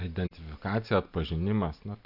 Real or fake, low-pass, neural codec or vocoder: real; 5.4 kHz; none